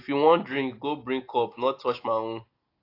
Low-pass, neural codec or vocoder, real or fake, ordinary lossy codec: 5.4 kHz; none; real; AAC, 32 kbps